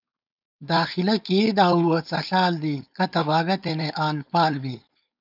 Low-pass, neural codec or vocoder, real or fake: 5.4 kHz; codec, 16 kHz, 4.8 kbps, FACodec; fake